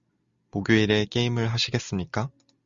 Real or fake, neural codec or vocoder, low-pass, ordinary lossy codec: real; none; 7.2 kHz; Opus, 64 kbps